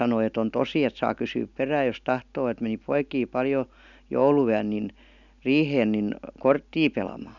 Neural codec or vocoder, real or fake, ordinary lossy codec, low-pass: none; real; none; 7.2 kHz